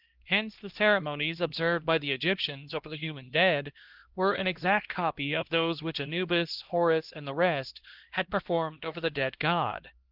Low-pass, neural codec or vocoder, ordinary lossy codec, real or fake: 5.4 kHz; codec, 16 kHz, 1 kbps, X-Codec, HuBERT features, trained on LibriSpeech; Opus, 16 kbps; fake